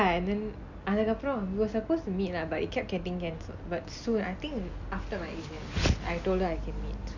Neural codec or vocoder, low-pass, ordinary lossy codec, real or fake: none; 7.2 kHz; none; real